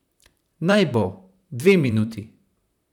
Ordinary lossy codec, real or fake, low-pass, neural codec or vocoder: none; fake; 19.8 kHz; vocoder, 44.1 kHz, 128 mel bands, Pupu-Vocoder